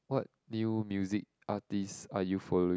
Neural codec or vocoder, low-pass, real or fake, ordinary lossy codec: none; none; real; none